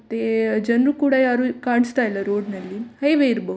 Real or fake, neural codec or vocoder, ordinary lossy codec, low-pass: real; none; none; none